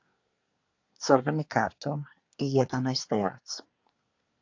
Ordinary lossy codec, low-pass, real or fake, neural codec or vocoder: AAC, 48 kbps; 7.2 kHz; fake; codec, 24 kHz, 1 kbps, SNAC